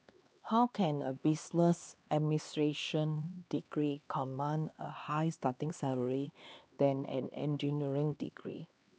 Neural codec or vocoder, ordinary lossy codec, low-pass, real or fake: codec, 16 kHz, 2 kbps, X-Codec, HuBERT features, trained on LibriSpeech; none; none; fake